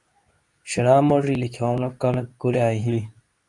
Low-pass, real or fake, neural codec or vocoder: 10.8 kHz; fake; codec, 24 kHz, 0.9 kbps, WavTokenizer, medium speech release version 2